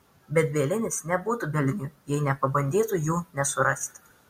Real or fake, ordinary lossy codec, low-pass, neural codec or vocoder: real; MP3, 64 kbps; 19.8 kHz; none